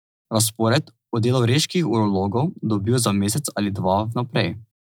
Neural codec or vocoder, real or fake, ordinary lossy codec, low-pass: none; real; none; none